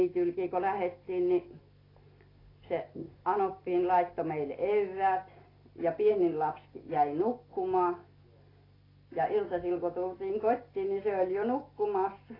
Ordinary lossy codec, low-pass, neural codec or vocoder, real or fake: AAC, 24 kbps; 5.4 kHz; none; real